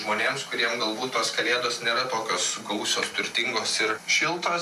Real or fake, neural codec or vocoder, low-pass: real; none; 14.4 kHz